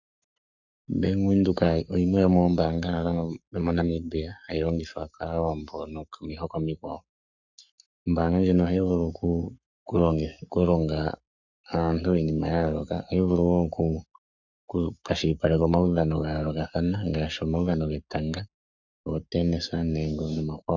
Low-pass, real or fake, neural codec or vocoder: 7.2 kHz; fake; codec, 44.1 kHz, 7.8 kbps, Pupu-Codec